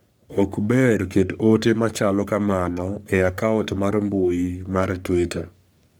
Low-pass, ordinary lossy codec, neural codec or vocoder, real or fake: none; none; codec, 44.1 kHz, 3.4 kbps, Pupu-Codec; fake